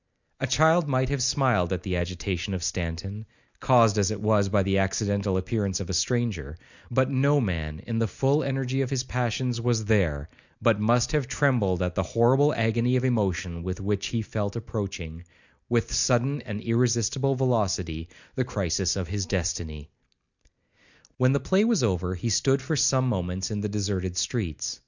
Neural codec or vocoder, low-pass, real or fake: none; 7.2 kHz; real